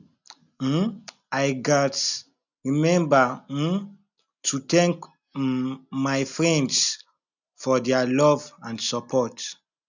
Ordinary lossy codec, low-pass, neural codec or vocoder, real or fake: none; 7.2 kHz; none; real